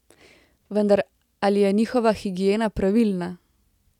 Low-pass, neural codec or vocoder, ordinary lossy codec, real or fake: 19.8 kHz; none; none; real